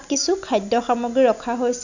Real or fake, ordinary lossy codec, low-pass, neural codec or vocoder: real; none; 7.2 kHz; none